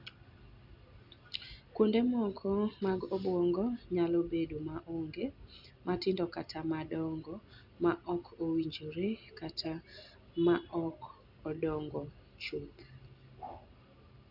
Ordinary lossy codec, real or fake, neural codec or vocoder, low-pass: none; real; none; 5.4 kHz